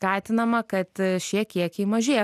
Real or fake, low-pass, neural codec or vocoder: real; 14.4 kHz; none